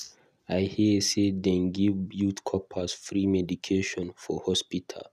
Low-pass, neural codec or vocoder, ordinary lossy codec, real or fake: 14.4 kHz; none; none; real